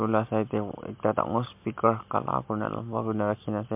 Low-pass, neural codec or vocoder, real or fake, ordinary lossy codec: 3.6 kHz; none; real; MP3, 32 kbps